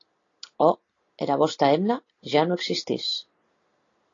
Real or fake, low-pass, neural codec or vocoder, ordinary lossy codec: real; 7.2 kHz; none; AAC, 32 kbps